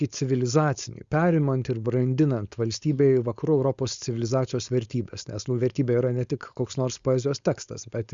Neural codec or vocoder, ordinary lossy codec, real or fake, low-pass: codec, 16 kHz, 4.8 kbps, FACodec; Opus, 64 kbps; fake; 7.2 kHz